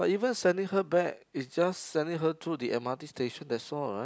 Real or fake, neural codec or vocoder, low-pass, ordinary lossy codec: real; none; none; none